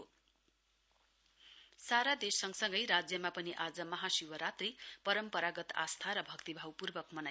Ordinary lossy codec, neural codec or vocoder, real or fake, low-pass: none; none; real; none